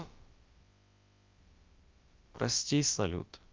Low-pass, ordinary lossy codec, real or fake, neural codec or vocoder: 7.2 kHz; Opus, 24 kbps; fake; codec, 16 kHz, about 1 kbps, DyCAST, with the encoder's durations